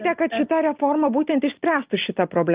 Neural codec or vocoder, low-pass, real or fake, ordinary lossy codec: none; 3.6 kHz; real; Opus, 32 kbps